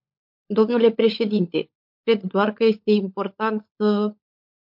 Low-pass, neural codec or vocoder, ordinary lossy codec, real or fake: 5.4 kHz; codec, 16 kHz, 16 kbps, FunCodec, trained on LibriTTS, 50 frames a second; MP3, 48 kbps; fake